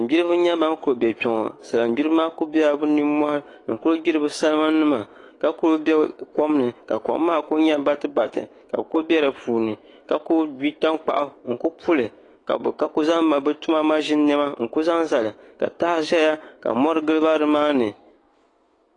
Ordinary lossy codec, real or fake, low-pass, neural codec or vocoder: AAC, 32 kbps; fake; 10.8 kHz; autoencoder, 48 kHz, 128 numbers a frame, DAC-VAE, trained on Japanese speech